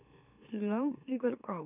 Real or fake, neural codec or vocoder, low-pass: fake; autoencoder, 44.1 kHz, a latent of 192 numbers a frame, MeloTTS; 3.6 kHz